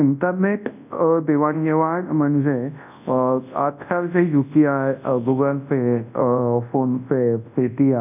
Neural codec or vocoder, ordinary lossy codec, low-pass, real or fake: codec, 24 kHz, 0.9 kbps, WavTokenizer, large speech release; none; 3.6 kHz; fake